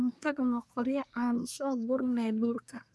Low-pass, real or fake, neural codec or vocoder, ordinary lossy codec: none; fake; codec, 24 kHz, 1 kbps, SNAC; none